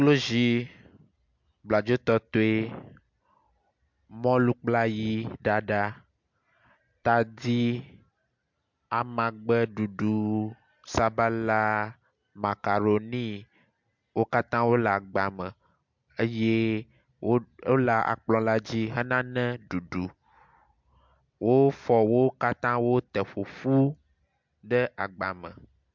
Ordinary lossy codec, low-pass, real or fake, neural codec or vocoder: MP3, 64 kbps; 7.2 kHz; real; none